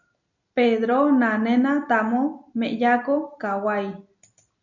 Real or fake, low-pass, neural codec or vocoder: real; 7.2 kHz; none